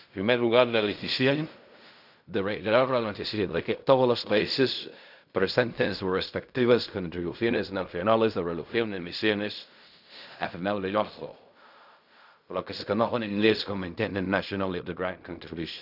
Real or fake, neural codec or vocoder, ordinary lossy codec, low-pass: fake; codec, 16 kHz in and 24 kHz out, 0.4 kbps, LongCat-Audio-Codec, fine tuned four codebook decoder; none; 5.4 kHz